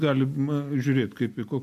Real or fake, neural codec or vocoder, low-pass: real; none; 14.4 kHz